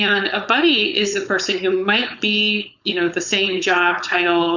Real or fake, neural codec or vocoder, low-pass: fake; codec, 16 kHz, 4.8 kbps, FACodec; 7.2 kHz